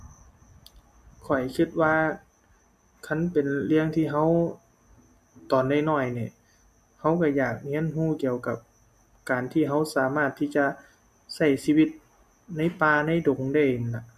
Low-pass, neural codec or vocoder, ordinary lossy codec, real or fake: 14.4 kHz; none; MP3, 64 kbps; real